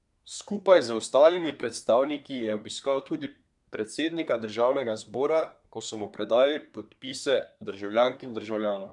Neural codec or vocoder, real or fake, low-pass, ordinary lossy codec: codec, 24 kHz, 1 kbps, SNAC; fake; 10.8 kHz; none